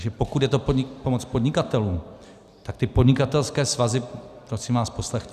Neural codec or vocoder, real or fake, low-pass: none; real; 14.4 kHz